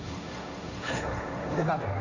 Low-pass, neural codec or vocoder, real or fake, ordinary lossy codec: 7.2 kHz; codec, 16 kHz, 1.1 kbps, Voila-Tokenizer; fake; AAC, 48 kbps